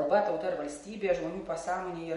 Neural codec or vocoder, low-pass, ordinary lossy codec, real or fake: none; 19.8 kHz; MP3, 48 kbps; real